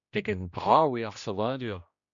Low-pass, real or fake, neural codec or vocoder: 7.2 kHz; fake; codec, 16 kHz, 1 kbps, X-Codec, HuBERT features, trained on general audio